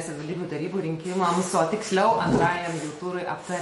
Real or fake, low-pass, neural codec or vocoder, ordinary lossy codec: fake; 19.8 kHz; autoencoder, 48 kHz, 128 numbers a frame, DAC-VAE, trained on Japanese speech; MP3, 48 kbps